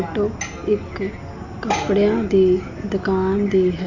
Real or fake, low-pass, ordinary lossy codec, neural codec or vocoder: real; 7.2 kHz; none; none